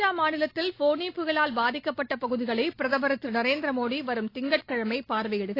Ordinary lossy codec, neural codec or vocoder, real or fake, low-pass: AAC, 32 kbps; none; real; 5.4 kHz